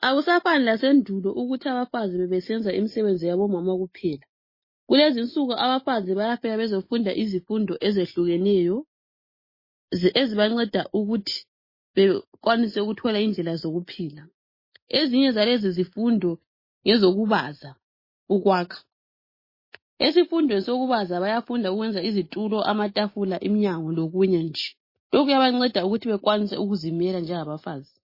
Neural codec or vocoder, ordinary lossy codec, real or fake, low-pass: none; MP3, 24 kbps; real; 5.4 kHz